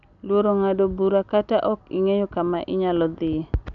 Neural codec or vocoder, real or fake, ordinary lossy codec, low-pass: none; real; none; 7.2 kHz